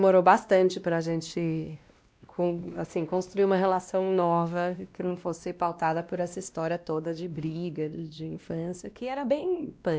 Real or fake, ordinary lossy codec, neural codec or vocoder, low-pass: fake; none; codec, 16 kHz, 1 kbps, X-Codec, WavLM features, trained on Multilingual LibriSpeech; none